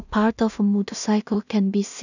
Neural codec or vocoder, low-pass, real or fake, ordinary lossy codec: codec, 16 kHz in and 24 kHz out, 0.4 kbps, LongCat-Audio-Codec, two codebook decoder; 7.2 kHz; fake; none